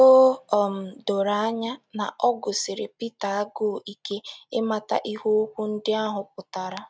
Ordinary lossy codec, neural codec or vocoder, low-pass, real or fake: none; none; none; real